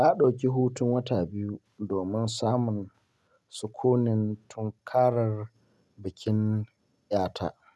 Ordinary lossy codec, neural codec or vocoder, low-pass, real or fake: none; none; none; real